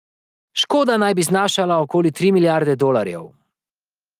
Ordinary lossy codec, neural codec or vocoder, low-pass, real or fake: Opus, 32 kbps; none; 14.4 kHz; real